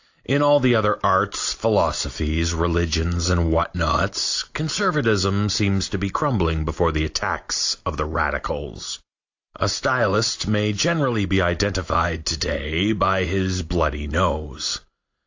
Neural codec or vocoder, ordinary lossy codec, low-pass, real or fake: none; AAC, 48 kbps; 7.2 kHz; real